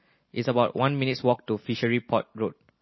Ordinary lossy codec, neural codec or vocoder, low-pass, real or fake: MP3, 24 kbps; none; 7.2 kHz; real